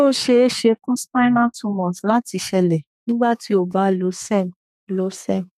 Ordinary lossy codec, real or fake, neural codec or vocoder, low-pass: none; fake; codec, 32 kHz, 1.9 kbps, SNAC; 14.4 kHz